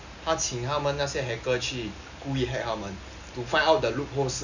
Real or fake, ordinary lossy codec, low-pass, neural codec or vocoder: real; none; 7.2 kHz; none